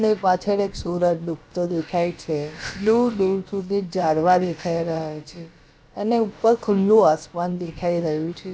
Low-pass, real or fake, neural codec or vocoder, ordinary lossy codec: none; fake; codec, 16 kHz, about 1 kbps, DyCAST, with the encoder's durations; none